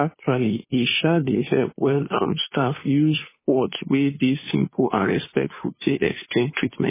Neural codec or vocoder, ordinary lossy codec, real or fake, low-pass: codec, 16 kHz, 2 kbps, FunCodec, trained on Chinese and English, 25 frames a second; MP3, 16 kbps; fake; 3.6 kHz